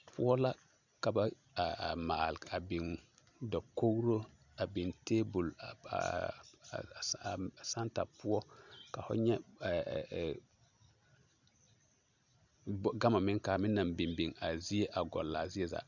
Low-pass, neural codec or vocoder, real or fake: 7.2 kHz; none; real